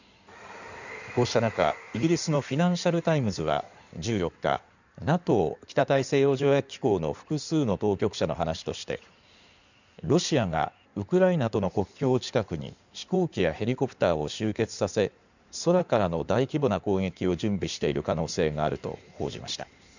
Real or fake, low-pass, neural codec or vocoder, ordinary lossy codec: fake; 7.2 kHz; codec, 16 kHz in and 24 kHz out, 2.2 kbps, FireRedTTS-2 codec; none